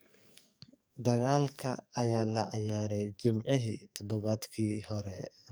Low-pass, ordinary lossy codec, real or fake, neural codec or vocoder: none; none; fake; codec, 44.1 kHz, 2.6 kbps, SNAC